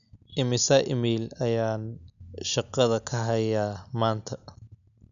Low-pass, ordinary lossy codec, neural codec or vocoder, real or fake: 7.2 kHz; none; none; real